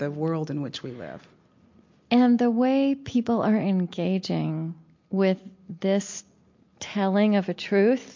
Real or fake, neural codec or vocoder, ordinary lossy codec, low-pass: real; none; MP3, 48 kbps; 7.2 kHz